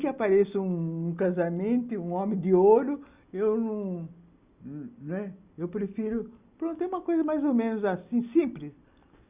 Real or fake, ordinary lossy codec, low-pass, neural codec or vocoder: real; Opus, 64 kbps; 3.6 kHz; none